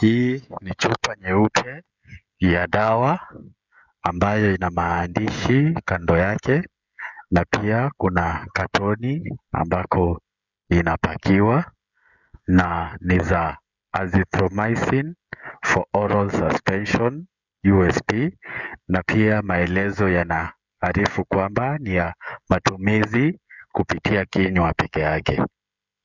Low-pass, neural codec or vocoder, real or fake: 7.2 kHz; codec, 16 kHz, 16 kbps, FreqCodec, smaller model; fake